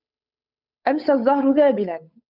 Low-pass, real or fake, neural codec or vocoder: 5.4 kHz; fake; codec, 16 kHz, 8 kbps, FunCodec, trained on Chinese and English, 25 frames a second